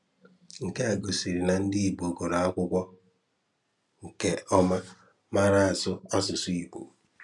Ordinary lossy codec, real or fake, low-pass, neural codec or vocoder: MP3, 96 kbps; real; 10.8 kHz; none